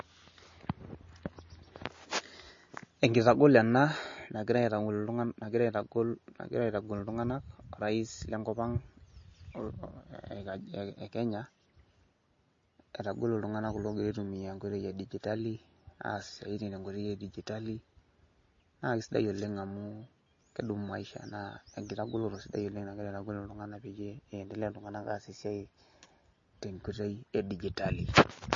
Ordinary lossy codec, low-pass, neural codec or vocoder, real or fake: MP3, 32 kbps; 7.2 kHz; none; real